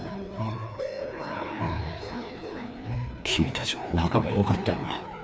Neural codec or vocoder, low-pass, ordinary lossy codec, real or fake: codec, 16 kHz, 2 kbps, FreqCodec, larger model; none; none; fake